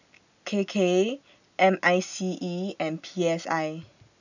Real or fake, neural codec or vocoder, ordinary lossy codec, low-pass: real; none; none; 7.2 kHz